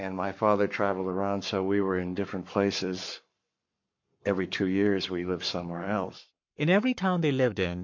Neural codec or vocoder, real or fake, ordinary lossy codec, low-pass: codec, 44.1 kHz, 7.8 kbps, Pupu-Codec; fake; MP3, 48 kbps; 7.2 kHz